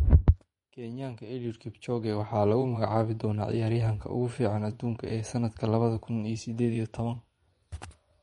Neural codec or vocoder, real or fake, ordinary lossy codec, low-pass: vocoder, 24 kHz, 100 mel bands, Vocos; fake; MP3, 48 kbps; 10.8 kHz